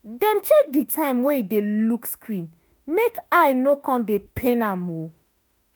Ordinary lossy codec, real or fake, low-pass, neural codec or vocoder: none; fake; none; autoencoder, 48 kHz, 32 numbers a frame, DAC-VAE, trained on Japanese speech